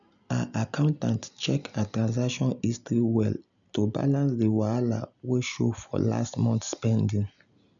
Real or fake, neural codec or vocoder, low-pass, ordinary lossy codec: real; none; 7.2 kHz; none